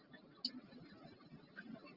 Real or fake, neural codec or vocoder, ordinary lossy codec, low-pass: real; none; Opus, 24 kbps; 5.4 kHz